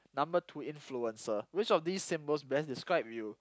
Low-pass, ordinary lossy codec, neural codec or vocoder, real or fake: none; none; none; real